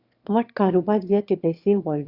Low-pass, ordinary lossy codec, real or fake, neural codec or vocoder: 5.4 kHz; Opus, 64 kbps; fake; autoencoder, 22.05 kHz, a latent of 192 numbers a frame, VITS, trained on one speaker